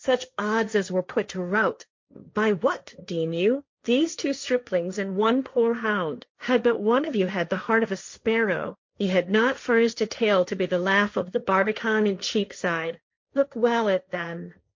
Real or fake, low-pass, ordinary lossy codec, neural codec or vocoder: fake; 7.2 kHz; MP3, 48 kbps; codec, 16 kHz, 1.1 kbps, Voila-Tokenizer